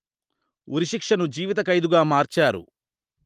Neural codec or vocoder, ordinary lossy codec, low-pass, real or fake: none; Opus, 24 kbps; 14.4 kHz; real